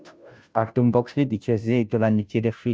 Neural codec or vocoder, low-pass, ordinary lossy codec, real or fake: codec, 16 kHz, 0.5 kbps, FunCodec, trained on Chinese and English, 25 frames a second; none; none; fake